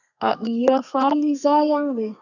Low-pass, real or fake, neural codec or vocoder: 7.2 kHz; fake; codec, 32 kHz, 1.9 kbps, SNAC